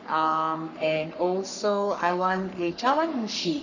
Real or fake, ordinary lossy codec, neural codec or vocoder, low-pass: fake; none; codec, 44.1 kHz, 3.4 kbps, Pupu-Codec; 7.2 kHz